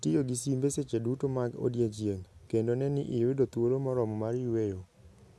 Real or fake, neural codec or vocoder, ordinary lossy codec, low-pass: real; none; none; none